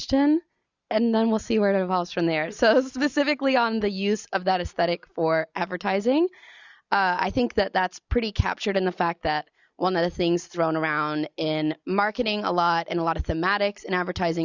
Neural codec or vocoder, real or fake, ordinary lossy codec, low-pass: none; real; Opus, 64 kbps; 7.2 kHz